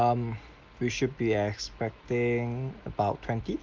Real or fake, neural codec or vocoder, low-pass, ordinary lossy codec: real; none; 7.2 kHz; Opus, 32 kbps